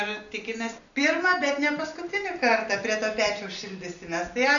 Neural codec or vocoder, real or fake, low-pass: none; real; 7.2 kHz